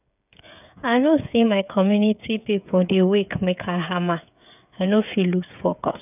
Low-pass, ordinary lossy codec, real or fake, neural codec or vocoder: 3.6 kHz; none; fake; codec, 16 kHz, 8 kbps, FreqCodec, smaller model